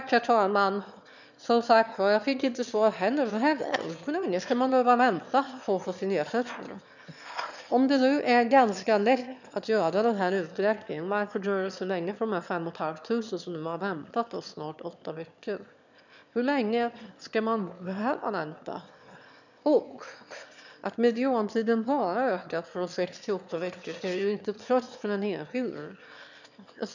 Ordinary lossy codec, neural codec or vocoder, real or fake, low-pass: none; autoencoder, 22.05 kHz, a latent of 192 numbers a frame, VITS, trained on one speaker; fake; 7.2 kHz